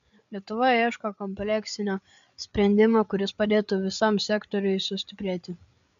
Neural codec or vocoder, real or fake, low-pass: codec, 16 kHz, 8 kbps, FreqCodec, larger model; fake; 7.2 kHz